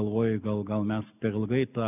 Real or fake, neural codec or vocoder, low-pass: real; none; 3.6 kHz